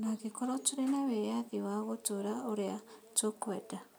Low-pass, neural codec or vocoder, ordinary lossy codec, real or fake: none; none; none; real